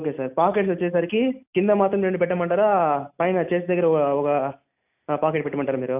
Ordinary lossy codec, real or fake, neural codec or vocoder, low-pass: AAC, 32 kbps; real; none; 3.6 kHz